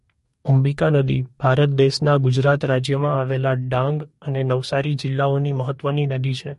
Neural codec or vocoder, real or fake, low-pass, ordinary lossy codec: codec, 44.1 kHz, 2.6 kbps, DAC; fake; 14.4 kHz; MP3, 48 kbps